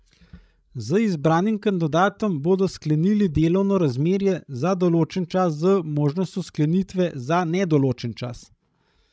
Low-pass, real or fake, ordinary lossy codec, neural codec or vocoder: none; fake; none; codec, 16 kHz, 16 kbps, FreqCodec, larger model